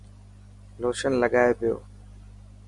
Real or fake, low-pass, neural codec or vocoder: real; 10.8 kHz; none